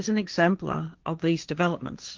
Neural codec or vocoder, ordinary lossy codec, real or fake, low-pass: codec, 16 kHz, 1.1 kbps, Voila-Tokenizer; Opus, 32 kbps; fake; 7.2 kHz